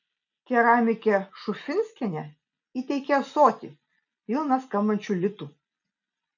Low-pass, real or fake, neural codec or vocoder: 7.2 kHz; real; none